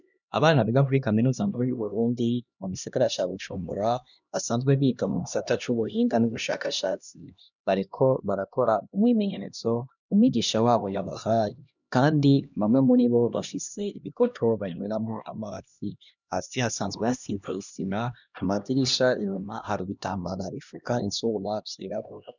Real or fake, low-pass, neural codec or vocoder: fake; 7.2 kHz; codec, 16 kHz, 1 kbps, X-Codec, HuBERT features, trained on LibriSpeech